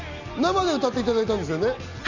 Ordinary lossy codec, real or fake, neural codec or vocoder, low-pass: none; real; none; 7.2 kHz